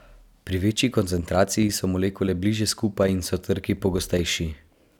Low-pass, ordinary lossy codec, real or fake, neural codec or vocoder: 19.8 kHz; none; real; none